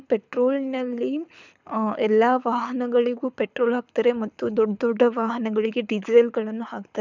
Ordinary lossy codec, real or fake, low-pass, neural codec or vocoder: none; fake; 7.2 kHz; codec, 24 kHz, 6 kbps, HILCodec